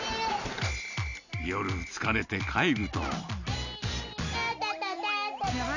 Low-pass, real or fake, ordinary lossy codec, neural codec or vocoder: 7.2 kHz; real; none; none